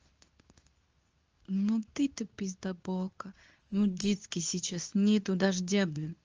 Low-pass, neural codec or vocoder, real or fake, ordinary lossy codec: 7.2 kHz; codec, 24 kHz, 0.9 kbps, WavTokenizer, medium speech release version 2; fake; Opus, 24 kbps